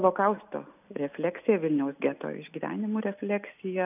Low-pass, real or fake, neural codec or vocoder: 3.6 kHz; real; none